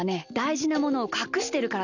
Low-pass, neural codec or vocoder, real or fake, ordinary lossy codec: 7.2 kHz; none; real; none